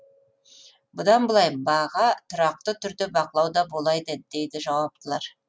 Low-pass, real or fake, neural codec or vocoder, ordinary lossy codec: none; real; none; none